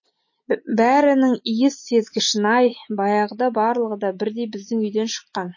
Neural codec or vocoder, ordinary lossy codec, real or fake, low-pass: none; MP3, 32 kbps; real; 7.2 kHz